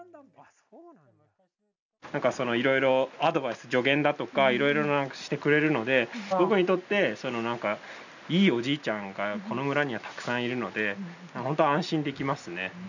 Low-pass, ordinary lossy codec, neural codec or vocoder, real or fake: 7.2 kHz; none; none; real